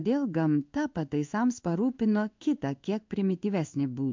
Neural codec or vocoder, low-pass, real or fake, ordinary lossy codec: codec, 16 kHz in and 24 kHz out, 1 kbps, XY-Tokenizer; 7.2 kHz; fake; MP3, 64 kbps